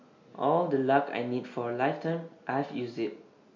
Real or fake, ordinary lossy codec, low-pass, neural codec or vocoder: real; MP3, 48 kbps; 7.2 kHz; none